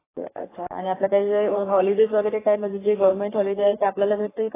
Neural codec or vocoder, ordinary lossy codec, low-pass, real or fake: codec, 44.1 kHz, 3.4 kbps, Pupu-Codec; AAC, 16 kbps; 3.6 kHz; fake